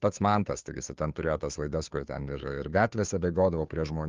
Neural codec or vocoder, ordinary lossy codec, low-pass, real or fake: codec, 16 kHz, 2 kbps, FunCodec, trained on Chinese and English, 25 frames a second; Opus, 32 kbps; 7.2 kHz; fake